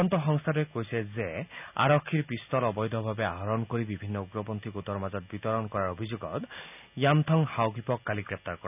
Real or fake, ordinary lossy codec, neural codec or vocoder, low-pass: real; none; none; 3.6 kHz